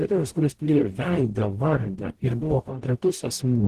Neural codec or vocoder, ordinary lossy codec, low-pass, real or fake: codec, 44.1 kHz, 0.9 kbps, DAC; Opus, 16 kbps; 14.4 kHz; fake